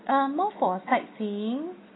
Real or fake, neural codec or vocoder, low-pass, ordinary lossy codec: real; none; 7.2 kHz; AAC, 16 kbps